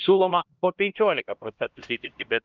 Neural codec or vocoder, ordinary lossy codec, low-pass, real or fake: codec, 16 kHz, 1 kbps, X-Codec, HuBERT features, trained on LibriSpeech; Opus, 32 kbps; 7.2 kHz; fake